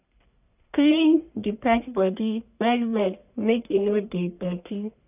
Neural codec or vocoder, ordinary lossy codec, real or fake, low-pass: codec, 44.1 kHz, 1.7 kbps, Pupu-Codec; none; fake; 3.6 kHz